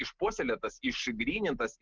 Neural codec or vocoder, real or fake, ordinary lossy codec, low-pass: none; real; Opus, 32 kbps; 7.2 kHz